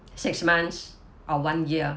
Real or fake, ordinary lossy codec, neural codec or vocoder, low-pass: real; none; none; none